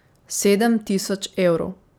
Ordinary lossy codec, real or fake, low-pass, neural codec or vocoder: none; fake; none; vocoder, 44.1 kHz, 128 mel bands every 256 samples, BigVGAN v2